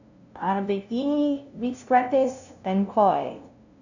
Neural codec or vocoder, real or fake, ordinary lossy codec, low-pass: codec, 16 kHz, 0.5 kbps, FunCodec, trained on LibriTTS, 25 frames a second; fake; none; 7.2 kHz